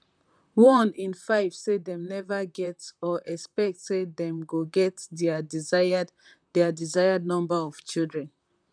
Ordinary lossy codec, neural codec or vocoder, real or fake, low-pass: none; vocoder, 44.1 kHz, 128 mel bands, Pupu-Vocoder; fake; 9.9 kHz